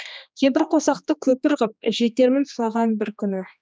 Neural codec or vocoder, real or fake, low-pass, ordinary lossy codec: codec, 16 kHz, 2 kbps, X-Codec, HuBERT features, trained on general audio; fake; none; none